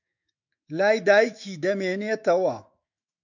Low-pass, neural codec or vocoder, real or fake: 7.2 kHz; autoencoder, 48 kHz, 128 numbers a frame, DAC-VAE, trained on Japanese speech; fake